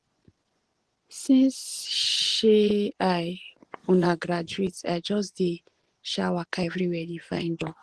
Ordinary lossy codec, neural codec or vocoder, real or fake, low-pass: Opus, 16 kbps; vocoder, 24 kHz, 100 mel bands, Vocos; fake; 10.8 kHz